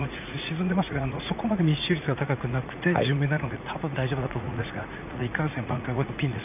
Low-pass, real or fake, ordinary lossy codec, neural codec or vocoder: 3.6 kHz; fake; none; vocoder, 44.1 kHz, 80 mel bands, Vocos